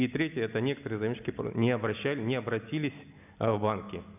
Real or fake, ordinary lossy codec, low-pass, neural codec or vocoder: real; none; 3.6 kHz; none